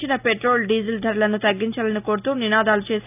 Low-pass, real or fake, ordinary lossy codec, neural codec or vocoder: 3.6 kHz; real; none; none